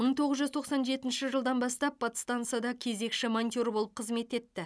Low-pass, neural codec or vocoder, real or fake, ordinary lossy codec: none; none; real; none